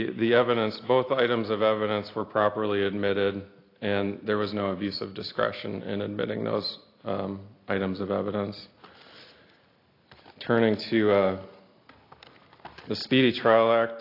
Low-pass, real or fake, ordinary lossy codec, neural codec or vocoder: 5.4 kHz; real; AAC, 32 kbps; none